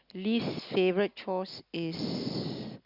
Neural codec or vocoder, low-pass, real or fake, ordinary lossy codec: none; 5.4 kHz; real; Opus, 64 kbps